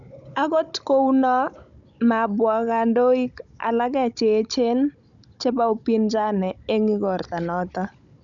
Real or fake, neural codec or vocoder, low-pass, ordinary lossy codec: fake; codec, 16 kHz, 16 kbps, FunCodec, trained on Chinese and English, 50 frames a second; 7.2 kHz; none